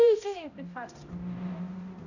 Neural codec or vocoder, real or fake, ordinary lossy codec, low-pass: codec, 16 kHz, 0.5 kbps, X-Codec, HuBERT features, trained on balanced general audio; fake; none; 7.2 kHz